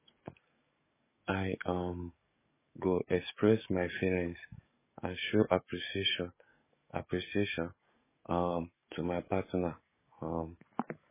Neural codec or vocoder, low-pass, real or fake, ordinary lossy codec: codec, 44.1 kHz, 7.8 kbps, DAC; 3.6 kHz; fake; MP3, 16 kbps